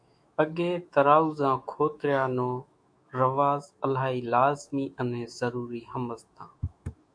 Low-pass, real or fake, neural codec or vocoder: 9.9 kHz; fake; autoencoder, 48 kHz, 128 numbers a frame, DAC-VAE, trained on Japanese speech